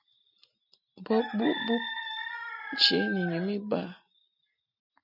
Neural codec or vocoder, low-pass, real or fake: none; 5.4 kHz; real